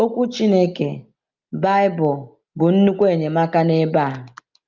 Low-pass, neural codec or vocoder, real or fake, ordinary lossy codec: 7.2 kHz; none; real; Opus, 24 kbps